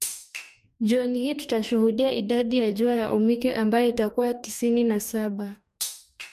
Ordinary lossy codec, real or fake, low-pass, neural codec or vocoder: MP3, 96 kbps; fake; 14.4 kHz; codec, 44.1 kHz, 2.6 kbps, DAC